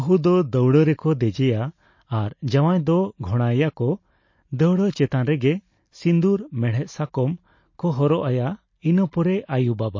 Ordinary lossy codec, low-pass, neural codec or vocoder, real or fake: MP3, 32 kbps; 7.2 kHz; none; real